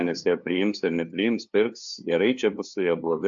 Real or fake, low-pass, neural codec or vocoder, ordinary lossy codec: fake; 7.2 kHz; codec, 16 kHz, 2 kbps, FunCodec, trained on LibriTTS, 25 frames a second; AAC, 64 kbps